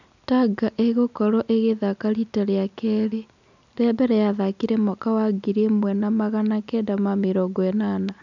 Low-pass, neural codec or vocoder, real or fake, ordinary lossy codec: 7.2 kHz; none; real; none